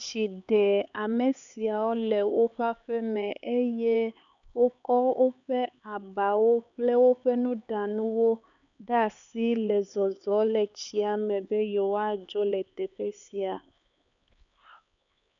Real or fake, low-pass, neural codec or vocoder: fake; 7.2 kHz; codec, 16 kHz, 4 kbps, X-Codec, HuBERT features, trained on LibriSpeech